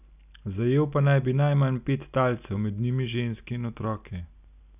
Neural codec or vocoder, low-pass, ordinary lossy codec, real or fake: none; 3.6 kHz; none; real